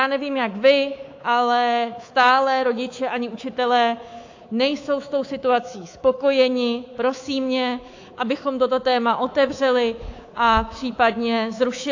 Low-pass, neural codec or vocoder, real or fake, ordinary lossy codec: 7.2 kHz; codec, 24 kHz, 3.1 kbps, DualCodec; fake; AAC, 48 kbps